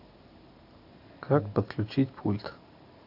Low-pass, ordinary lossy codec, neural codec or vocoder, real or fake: 5.4 kHz; AAC, 32 kbps; none; real